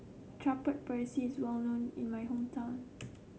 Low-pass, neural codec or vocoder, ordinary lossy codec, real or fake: none; none; none; real